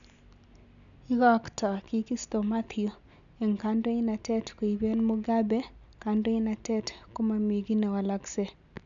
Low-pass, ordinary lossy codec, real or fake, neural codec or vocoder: 7.2 kHz; none; real; none